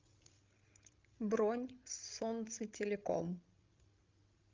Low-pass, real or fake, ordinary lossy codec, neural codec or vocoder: 7.2 kHz; fake; Opus, 32 kbps; codec, 16 kHz, 16 kbps, FreqCodec, larger model